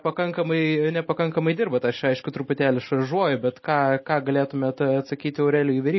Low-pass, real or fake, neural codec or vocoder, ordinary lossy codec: 7.2 kHz; real; none; MP3, 24 kbps